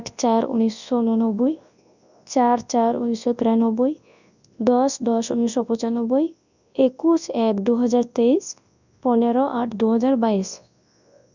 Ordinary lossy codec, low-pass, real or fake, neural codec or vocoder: none; 7.2 kHz; fake; codec, 24 kHz, 0.9 kbps, WavTokenizer, large speech release